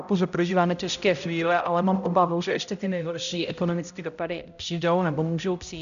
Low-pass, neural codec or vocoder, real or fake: 7.2 kHz; codec, 16 kHz, 0.5 kbps, X-Codec, HuBERT features, trained on balanced general audio; fake